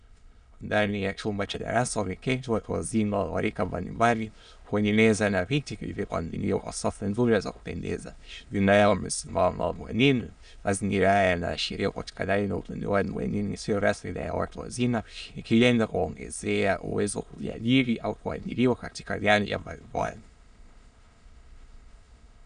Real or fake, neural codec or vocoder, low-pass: fake; autoencoder, 22.05 kHz, a latent of 192 numbers a frame, VITS, trained on many speakers; 9.9 kHz